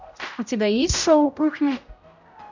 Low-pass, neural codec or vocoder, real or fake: 7.2 kHz; codec, 16 kHz, 0.5 kbps, X-Codec, HuBERT features, trained on general audio; fake